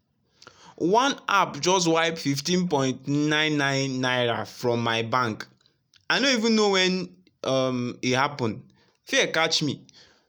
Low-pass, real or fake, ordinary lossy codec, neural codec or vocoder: none; real; none; none